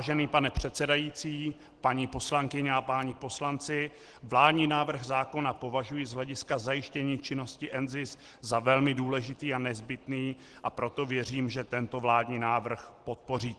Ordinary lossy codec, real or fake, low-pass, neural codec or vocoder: Opus, 16 kbps; real; 10.8 kHz; none